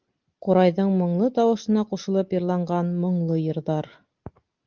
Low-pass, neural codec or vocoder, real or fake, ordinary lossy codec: 7.2 kHz; none; real; Opus, 32 kbps